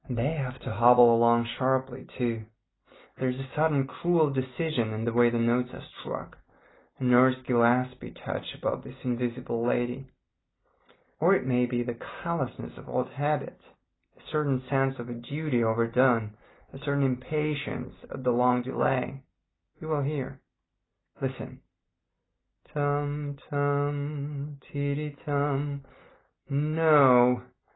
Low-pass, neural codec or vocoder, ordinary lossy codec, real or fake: 7.2 kHz; none; AAC, 16 kbps; real